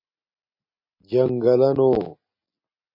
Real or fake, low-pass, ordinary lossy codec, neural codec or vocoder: real; 5.4 kHz; MP3, 32 kbps; none